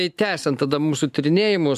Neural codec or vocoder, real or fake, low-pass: none; real; 14.4 kHz